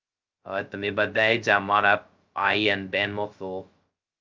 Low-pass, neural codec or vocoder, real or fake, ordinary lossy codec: 7.2 kHz; codec, 16 kHz, 0.2 kbps, FocalCodec; fake; Opus, 24 kbps